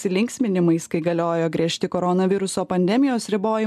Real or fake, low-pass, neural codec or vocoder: real; 14.4 kHz; none